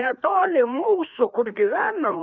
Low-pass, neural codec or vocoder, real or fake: 7.2 kHz; codec, 16 kHz, 2 kbps, FreqCodec, larger model; fake